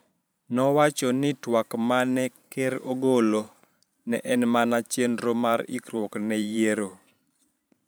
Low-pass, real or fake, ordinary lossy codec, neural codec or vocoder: none; fake; none; vocoder, 44.1 kHz, 128 mel bands every 512 samples, BigVGAN v2